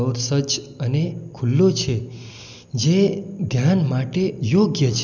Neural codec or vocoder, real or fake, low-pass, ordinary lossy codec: none; real; 7.2 kHz; none